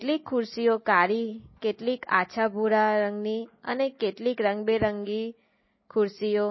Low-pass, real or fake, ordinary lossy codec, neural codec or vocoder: 7.2 kHz; real; MP3, 24 kbps; none